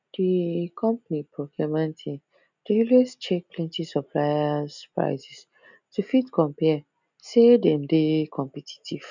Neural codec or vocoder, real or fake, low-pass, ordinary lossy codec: none; real; 7.2 kHz; none